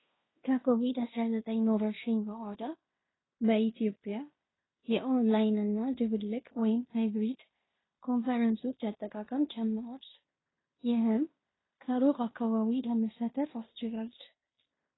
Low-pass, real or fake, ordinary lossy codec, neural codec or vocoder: 7.2 kHz; fake; AAC, 16 kbps; codec, 16 kHz in and 24 kHz out, 0.9 kbps, LongCat-Audio-Codec, fine tuned four codebook decoder